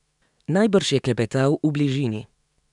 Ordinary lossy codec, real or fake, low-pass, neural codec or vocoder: none; fake; 10.8 kHz; autoencoder, 48 kHz, 128 numbers a frame, DAC-VAE, trained on Japanese speech